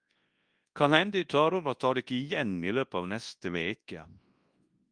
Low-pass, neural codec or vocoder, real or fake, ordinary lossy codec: 9.9 kHz; codec, 24 kHz, 0.9 kbps, WavTokenizer, large speech release; fake; Opus, 32 kbps